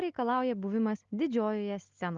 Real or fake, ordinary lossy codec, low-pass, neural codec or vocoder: real; Opus, 32 kbps; 7.2 kHz; none